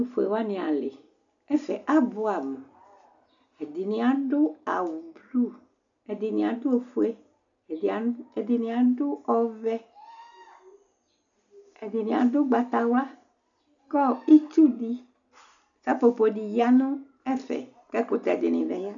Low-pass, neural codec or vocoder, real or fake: 7.2 kHz; none; real